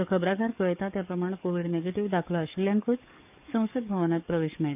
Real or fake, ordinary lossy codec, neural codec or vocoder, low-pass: fake; none; codec, 44.1 kHz, 7.8 kbps, DAC; 3.6 kHz